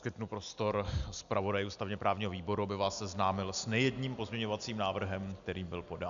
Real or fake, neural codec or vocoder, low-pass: real; none; 7.2 kHz